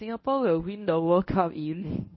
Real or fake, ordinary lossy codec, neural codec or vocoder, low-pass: fake; MP3, 24 kbps; codec, 24 kHz, 0.9 kbps, WavTokenizer, medium speech release version 1; 7.2 kHz